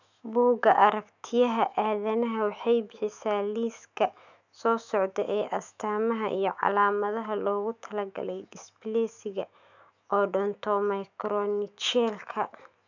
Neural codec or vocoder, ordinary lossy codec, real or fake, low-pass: autoencoder, 48 kHz, 128 numbers a frame, DAC-VAE, trained on Japanese speech; none; fake; 7.2 kHz